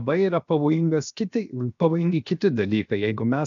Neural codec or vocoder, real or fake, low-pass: codec, 16 kHz, 0.7 kbps, FocalCodec; fake; 7.2 kHz